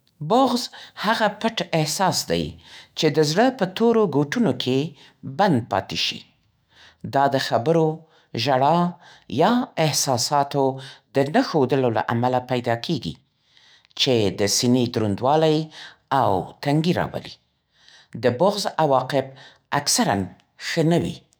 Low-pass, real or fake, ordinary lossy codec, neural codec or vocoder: none; fake; none; autoencoder, 48 kHz, 128 numbers a frame, DAC-VAE, trained on Japanese speech